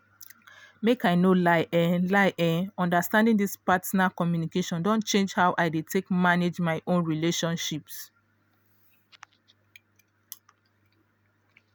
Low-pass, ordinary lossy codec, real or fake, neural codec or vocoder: none; none; real; none